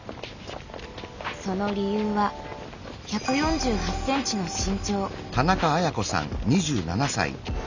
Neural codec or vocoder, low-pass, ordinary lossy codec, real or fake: none; 7.2 kHz; none; real